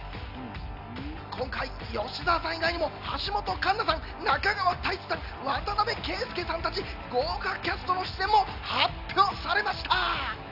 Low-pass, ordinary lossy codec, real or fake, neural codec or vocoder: 5.4 kHz; none; real; none